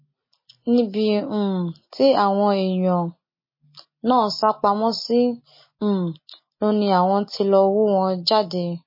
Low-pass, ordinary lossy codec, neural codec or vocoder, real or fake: 5.4 kHz; MP3, 24 kbps; none; real